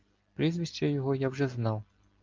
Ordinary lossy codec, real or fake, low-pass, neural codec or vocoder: Opus, 24 kbps; real; 7.2 kHz; none